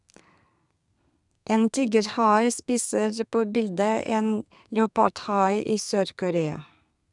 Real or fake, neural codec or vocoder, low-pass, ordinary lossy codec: fake; codec, 32 kHz, 1.9 kbps, SNAC; 10.8 kHz; none